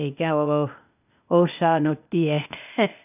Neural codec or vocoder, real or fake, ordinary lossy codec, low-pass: codec, 16 kHz, 0.8 kbps, ZipCodec; fake; none; 3.6 kHz